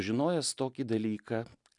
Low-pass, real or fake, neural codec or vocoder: 10.8 kHz; real; none